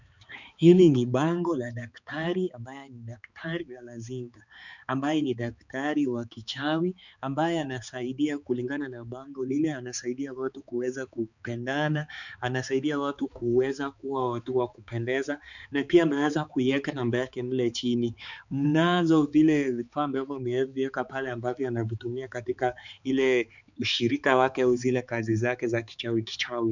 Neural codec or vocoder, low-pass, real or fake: codec, 16 kHz, 4 kbps, X-Codec, HuBERT features, trained on balanced general audio; 7.2 kHz; fake